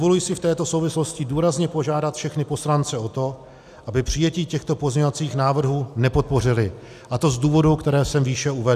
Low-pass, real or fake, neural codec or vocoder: 14.4 kHz; real; none